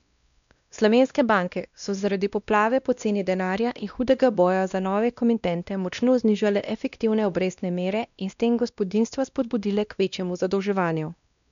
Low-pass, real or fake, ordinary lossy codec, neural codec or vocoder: 7.2 kHz; fake; MP3, 96 kbps; codec, 16 kHz, 1 kbps, X-Codec, WavLM features, trained on Multilingual LibriSpeech